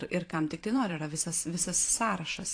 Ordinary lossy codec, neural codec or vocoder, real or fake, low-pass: AAC, 48 kbps; vocoder, 44.1 kHz, 128 mel bands every 512 samples, BigVGAN v2; fake; 9.9 kHz